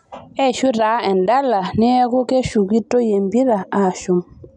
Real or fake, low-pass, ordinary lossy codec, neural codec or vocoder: real; 10.8 kHz; none; none